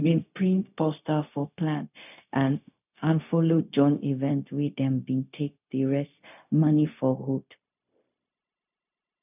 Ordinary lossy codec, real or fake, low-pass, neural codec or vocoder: none; fake; 3.6 kHz; codec, 16 kHz, 0.4 kbps, LongCat-Audio-Codec